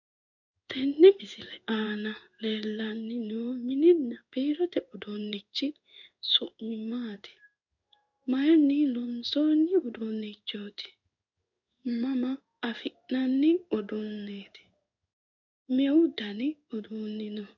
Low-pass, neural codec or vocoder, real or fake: 7.2 kHz; codec, 16 kHz in and 24 kHz out, 1 kbps, XY-Tokenizer; fake